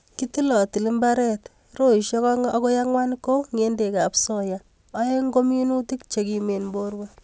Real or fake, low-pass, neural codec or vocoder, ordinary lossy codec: real; none; none; none